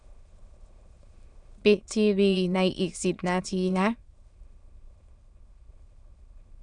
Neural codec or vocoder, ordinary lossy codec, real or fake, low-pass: autoencoder, 22.05 kHz, a latent of 192 numbers a frame, VITS, trained on many speakers; Opus, 64 kbps; fake; 9.9 kHz